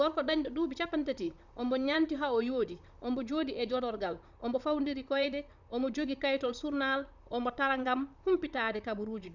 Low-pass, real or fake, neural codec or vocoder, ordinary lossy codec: 7.2 kHz; fake; vocoder, 44.1 kHz, 128 mel bands, Pupu-Vocoder; none